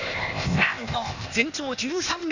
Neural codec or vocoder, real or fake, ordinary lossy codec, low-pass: codec, 16 kHz, 0.8 kbps, ZipCodec; fake; none; 7.2 kHz